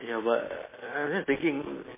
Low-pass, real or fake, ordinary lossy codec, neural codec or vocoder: 3.6 kHz; real; MP3, 16 kbps; none